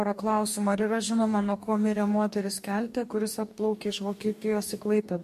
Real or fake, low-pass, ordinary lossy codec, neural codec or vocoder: fake; 14.4 kHz; MP3, 64 kbps; codec, 44.1 kHz, 2.6 kbps, DAC